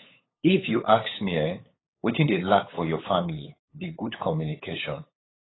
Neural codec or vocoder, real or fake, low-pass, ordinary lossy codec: codec, 16 kHz, 8 kbps, FunCodec, trained on LibriTTS, 25 frames a second; fake; 7.2 kHz; AAC, 16 kbps